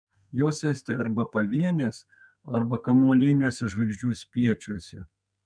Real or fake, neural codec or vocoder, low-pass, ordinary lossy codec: fake; codec, 32 kHz, 1.9 kbps, SNAC; 9.9 kHz; MP3, 96 kbps